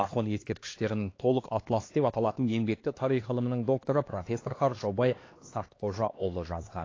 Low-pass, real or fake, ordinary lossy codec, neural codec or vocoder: 7.2 kHz; fake; AAC, 32 kbps; codec, 16 kHz, 2 kbps, X-Codec, HuBERT features, trained on LibriSpeech